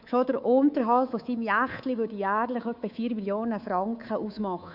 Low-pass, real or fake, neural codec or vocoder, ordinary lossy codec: 5.4 kHz; fake; codec, 24 kHz, 3.1 kbps, DualCodec; none